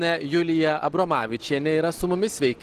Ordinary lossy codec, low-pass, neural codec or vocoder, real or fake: Opus, 16 kbps; 14.4 kHz; none; real